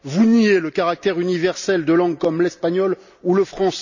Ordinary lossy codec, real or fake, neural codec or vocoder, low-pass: none; real; none; 7.2 kHz